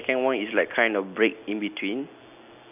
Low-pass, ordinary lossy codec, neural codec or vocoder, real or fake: 3.6 kHz; none; none; real